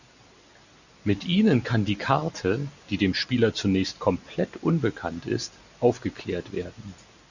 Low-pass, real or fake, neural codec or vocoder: 7.2 kHz; real; none